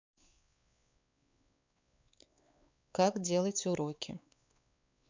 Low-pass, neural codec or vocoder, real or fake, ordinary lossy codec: 7.2 kHz; codec, 16 kHz, 4 kbps, X-Codec, WavLM features, trained on Multilingual LibriSpeech; fake; MP3, 64 kbps